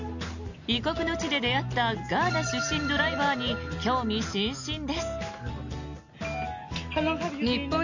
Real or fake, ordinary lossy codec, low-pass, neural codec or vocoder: real; none; 7.2 kHz; none